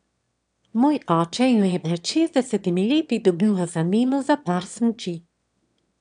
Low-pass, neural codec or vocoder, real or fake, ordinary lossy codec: 9.9 kHz; autoencoder, 22.05 kHz, a latent of 192 numbers a frame, VITS, trained on one speaker; fake; none